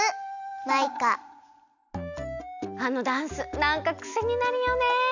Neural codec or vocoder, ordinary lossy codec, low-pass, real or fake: none; none; 7.2 kHz; real